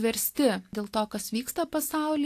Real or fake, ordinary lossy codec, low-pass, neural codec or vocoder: real; AAC, 64 kbps; 14.4 kHz; none